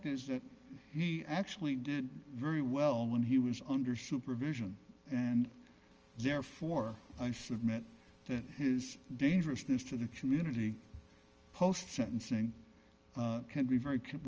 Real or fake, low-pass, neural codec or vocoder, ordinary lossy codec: real; 7.2 kHz; none; Opus, 24 kbps